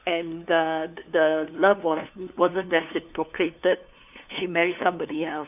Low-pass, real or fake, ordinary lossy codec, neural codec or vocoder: 3.6 kHz; fake; none; codec, 16 kHz, 2 kbps, FunCodec, trained on LibriTTS, 25 frames a second